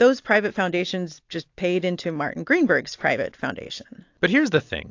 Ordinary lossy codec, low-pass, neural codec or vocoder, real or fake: AAC, 48 kbps; 7.2 kHz; none; real